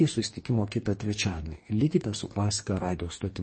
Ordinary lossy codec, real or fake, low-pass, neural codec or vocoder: MP3, 32 kbps; fake; 9.9 kHz; codec, 44.1 kHz, 2.6 kbps, DAC